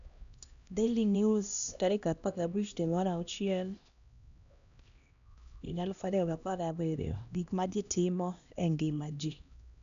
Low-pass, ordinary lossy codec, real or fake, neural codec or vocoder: 7.2 kHz; AAC, 96 kbps; fake; codec, 16 kHz, 1 kbps, X-Codec, HuBERT features, trained on LibriSpeech